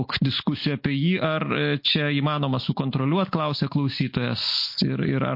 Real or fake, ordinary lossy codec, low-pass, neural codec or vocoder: real; MP3, 32 kbps; 5.4 kHz; none